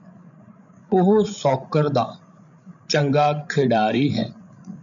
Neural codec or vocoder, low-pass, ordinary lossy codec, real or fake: codec, 16 kHz, 16 kbps, FreqCodec, larger model; 7.2 kHz; MP3, 96 kbps; fake